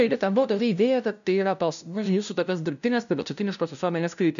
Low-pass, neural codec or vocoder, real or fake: 7.2 kHz; codec, 16 kHz, 0.5 kbps, FunCodec, trained on LibriTTS, 25 frames a second; fake